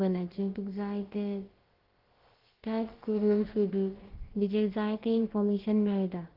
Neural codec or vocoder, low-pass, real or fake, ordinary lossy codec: codec, 16 kHz, about 1 kbps, DyCAST, with the encoder's durations; 5.4 kHz; fake; Opus, 16 kbps